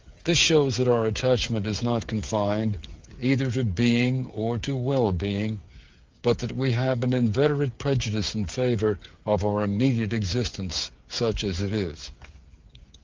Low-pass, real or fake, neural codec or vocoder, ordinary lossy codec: 7.2 kHz; fake; codec, 16 kHz, 8 kbps, FreqCodec, smaller model; Opus, 24 kbps